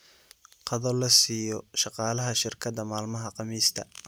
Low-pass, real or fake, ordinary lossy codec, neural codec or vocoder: none; real; none; none